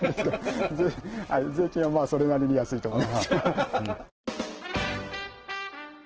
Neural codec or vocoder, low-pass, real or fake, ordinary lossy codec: none; 7.2 kHz; real; Opus, 16 kbps